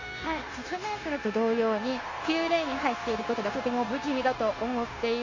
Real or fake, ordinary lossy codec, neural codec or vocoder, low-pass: fake; AAC, 32 kbps; codec, 16 kHz, 0.9 kbps, LongCat-Audio-Codec; 7.2 kHz